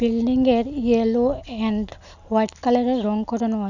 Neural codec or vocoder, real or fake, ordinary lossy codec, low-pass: none; real; none; 7.2 kHz